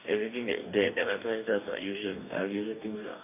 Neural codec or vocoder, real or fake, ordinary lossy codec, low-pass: codec, 44.1 kHz, 2.6 kbps, DAC; fake; none; 3.6 kHz